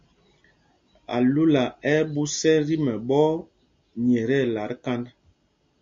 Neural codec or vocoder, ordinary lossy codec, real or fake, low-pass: none; MP3, 48 kbps; real; 7.2 kHz